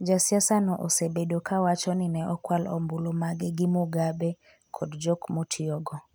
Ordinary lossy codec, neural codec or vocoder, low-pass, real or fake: none; none; none; real